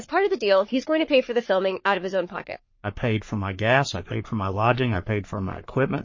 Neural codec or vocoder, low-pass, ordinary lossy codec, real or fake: codec, 44.1 kHz, 3.4 kbps, Pupu-Codec; 7.2 kHz; MP3, 32 kbps; fake